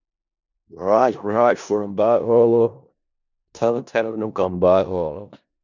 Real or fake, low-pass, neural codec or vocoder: fake; 7.2 kHz; codec, 16 kHz in and 24 kHz out, 0.4 kbps, LongCat-Audio-Codec, four codebook decoder